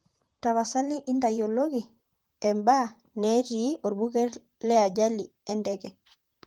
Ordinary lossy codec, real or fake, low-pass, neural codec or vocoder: Opus, 24 kbps; fake; 19.8 kHz; vocoder, 44.1 kHz, 128 mel bands, Pupu-Vocoder